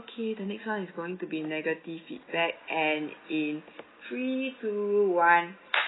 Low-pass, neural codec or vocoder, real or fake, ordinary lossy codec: 7.2 kHz; none; real; AAC, 16 kbps